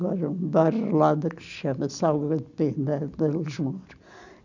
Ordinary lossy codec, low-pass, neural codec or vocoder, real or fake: none; 7.2 kHz; none; real